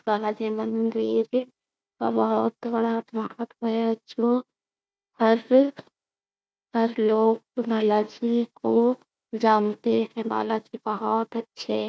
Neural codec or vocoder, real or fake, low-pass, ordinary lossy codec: codec, 16 kHz, 1 kbps, FunCodec, trained on Chinese and English, 50 frames a second; fake; none; none